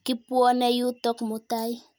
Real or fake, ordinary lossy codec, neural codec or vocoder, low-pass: real; none; none; none